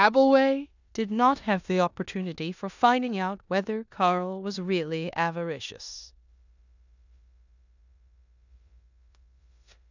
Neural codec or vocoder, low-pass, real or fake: codec, 16 kHz in and 24 kHz out, 0.9 kbps, LongCat-Audio-Codec, four codebook decoder; 7.2 kHz; fake